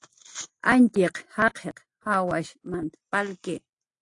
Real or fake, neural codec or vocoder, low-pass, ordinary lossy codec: real; none; 10.8 kHz; AAC, 48 kbps